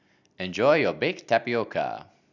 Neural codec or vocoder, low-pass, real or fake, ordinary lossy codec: none; 7.2 kHz; real; none